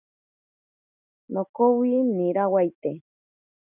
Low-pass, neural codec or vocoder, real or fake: 3.6 kHz; none; real